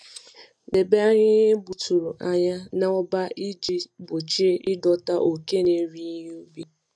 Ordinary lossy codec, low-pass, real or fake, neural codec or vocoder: none; none; real; none